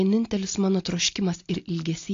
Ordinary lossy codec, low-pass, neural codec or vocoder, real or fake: AAC, 48 kbps; 7.2 kHz; none; real